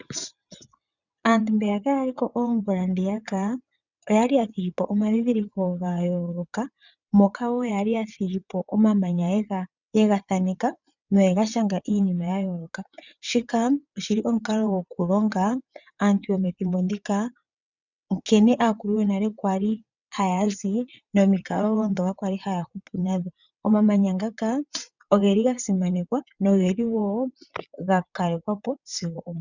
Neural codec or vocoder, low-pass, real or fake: vocoder, 22.05 kHz, 80 mel bands, WaveNeXt; 7.2 kHz; fake